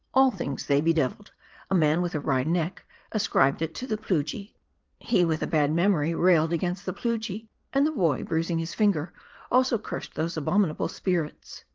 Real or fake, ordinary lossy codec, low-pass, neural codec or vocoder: fake; Opus, 24 kbps; 7.2 kHz; vocoder, 22.05 kHz, 80 mel bands, Vocos